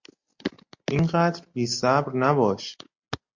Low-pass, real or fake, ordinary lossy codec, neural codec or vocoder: 7.2 kHz; real; MP3, 48 kbps; none